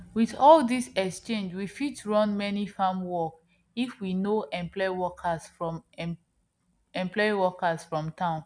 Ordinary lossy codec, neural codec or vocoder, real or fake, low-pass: none; none; real; 9.9 kHz